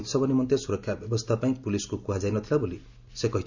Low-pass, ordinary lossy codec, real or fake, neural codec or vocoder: 7.2 kHz; none; real; none